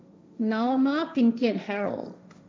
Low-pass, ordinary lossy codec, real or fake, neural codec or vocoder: none; none; fake; codec, 16 kHz, 1.1 kbps, Voila-Tokenizer